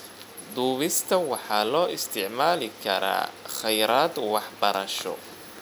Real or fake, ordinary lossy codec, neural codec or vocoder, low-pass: real; none; none; none